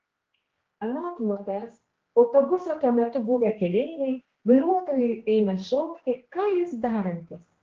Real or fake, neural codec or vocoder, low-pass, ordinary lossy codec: fake; codec, 16 kHz, 1 kbps, X-Codec, HuBERT features, trained on general audio; 7.2 kHz; Opus, 16 kbps